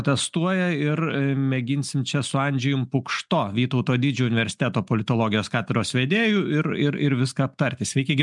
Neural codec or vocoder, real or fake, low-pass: none; real; 10.8 kHz